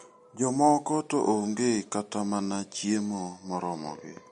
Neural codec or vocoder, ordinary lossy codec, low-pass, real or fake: none; MP3, 48 kbps; 10.8 kHz; real